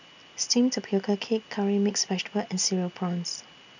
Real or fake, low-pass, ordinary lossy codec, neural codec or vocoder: real; 7.2 kHz; none; none